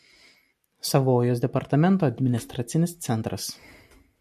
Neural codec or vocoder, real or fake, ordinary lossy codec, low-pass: none; real; MP3, 96 kbps; 14.4 kHz